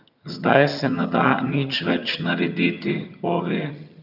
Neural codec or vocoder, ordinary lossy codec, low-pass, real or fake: vocoder, 22.05 kHz, 80 mel bands, HiFi-GAN; none; 5.4 kHz; fake